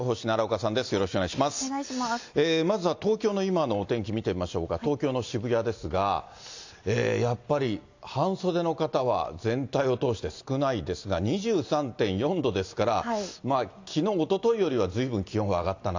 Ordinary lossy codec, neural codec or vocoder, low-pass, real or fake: AAC, 48 kbps; none; 7.2 kHz; real